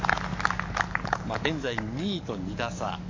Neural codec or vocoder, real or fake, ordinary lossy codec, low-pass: codec, 44.1 kHz, 7.8 kbps, DAC; fake; MP3, 48 kbps; 7.2 kHz